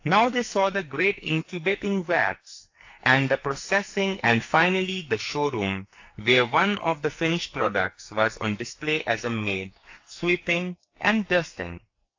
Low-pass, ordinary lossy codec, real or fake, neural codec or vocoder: 7.2 kHz; AAC, 48 kbps; fake; codec, 44.1 kHz, 2.6 kbps, SNAC